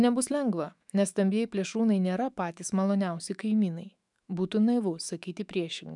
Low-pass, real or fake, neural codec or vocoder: 10.8 kHz; fake; autoencoder, 48 kHz, 128 numbers a frame, DAC-VAE, trained on Japanese speech